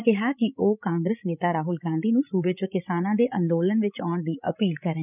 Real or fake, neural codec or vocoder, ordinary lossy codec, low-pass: fake; codec, 16 kHz, 16 kbps, FreqCodec, larger model; none; 3.6 kHz